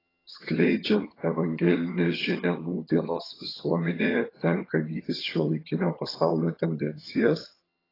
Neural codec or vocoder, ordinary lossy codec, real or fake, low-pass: vocoder, 22.05 kHz, 80 mel bands, HiFi-GAN; AAC, 24 kbps; fake; 5.4 kHz